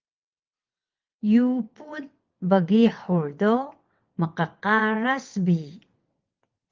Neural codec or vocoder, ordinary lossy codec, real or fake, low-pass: vocoder, 22.05 kHz, 80 mel bands, WaveNeXt; Opus, 24 kbps; fake; 7.2 kHz